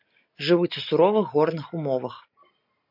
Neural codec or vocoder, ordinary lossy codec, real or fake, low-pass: none; MP3, 48 kbps; real; 5.4 kHz